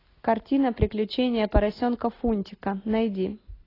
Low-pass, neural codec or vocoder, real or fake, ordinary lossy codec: 5.4 kHz; none; real; AAC, 24 kbps